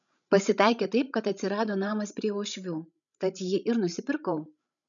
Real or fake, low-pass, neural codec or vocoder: fake; 7.2 kHz; codec, 16 kHz, 16 kbps, FreqCodec, larger model